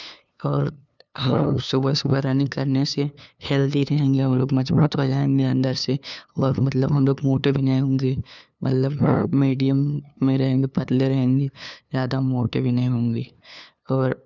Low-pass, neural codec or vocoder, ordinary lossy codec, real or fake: 7.2 kHz; codec, 16 kHz, 2 kbps, FunCodec, trained on LibriTTS, 25 frames a second; none; fake